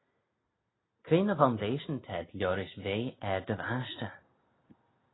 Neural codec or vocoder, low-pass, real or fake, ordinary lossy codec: none; 7.2 kHz; real; AAC, 16 kbps